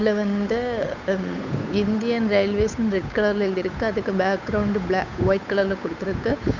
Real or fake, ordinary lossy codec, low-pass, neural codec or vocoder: fake; none; 7.2 kHz; codec, 16 kHz, 8 kbps, FunCodec, trained on Chinese and English, 25 frames a second